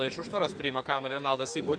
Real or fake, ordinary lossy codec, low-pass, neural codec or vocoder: fake; Opus, 64 kbps; 9.9 kHz; codec, 44.1 kHz, 2.6 kbps, SNAC